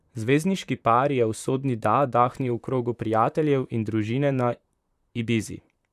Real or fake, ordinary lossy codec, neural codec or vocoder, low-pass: fake; none; vocoder, 44.1 kHz, 128 mel bands, Pupu-Vocoder; 14.4 kHz